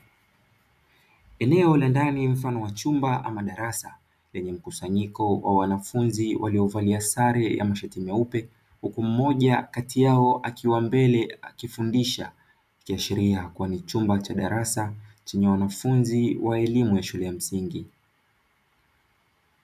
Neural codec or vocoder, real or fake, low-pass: none; real; 14.4 kHz